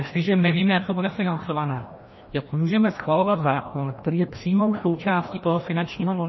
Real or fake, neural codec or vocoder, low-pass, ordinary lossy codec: fake; codec, 16 kHz, 1 kbps, FreqCodec, larger model; 7.2 kHz; MP3, 24 kbps